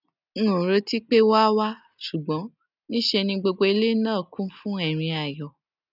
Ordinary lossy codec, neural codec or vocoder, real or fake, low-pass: none; none; real; 5.4 kHz